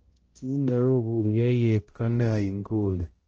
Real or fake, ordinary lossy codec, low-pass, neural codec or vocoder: fake; Opus, 16 kbps; 7.2 kHz; codec, 16 kHz, 0.5 kbps, X-Codec, HuBERT features, trained on balanced general audio